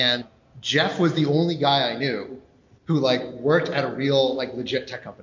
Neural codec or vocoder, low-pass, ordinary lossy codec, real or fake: none; 7.2 kHz; MP3, 48 kbps; real